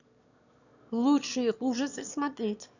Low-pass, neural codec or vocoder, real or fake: 7.2 kHz; autoencoder, 22.05 kHz, a latent of 192 numbers a frame, VITS, trained on one speaker; fake